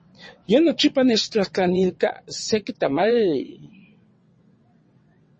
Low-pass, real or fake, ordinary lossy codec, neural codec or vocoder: 9.9 kHz; fake; MP3, 32 kbps; vocoder, 22.05 kHz, 80 mel bands, WaveNeXt